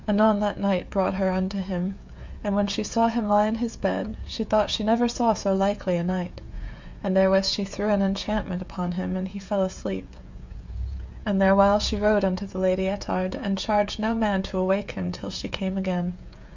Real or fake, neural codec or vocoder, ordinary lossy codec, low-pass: fake; codec, 16 kHz, 16 kbps, FreqCodec, smaller model; MP3, 64 kbps; 7.2 kHz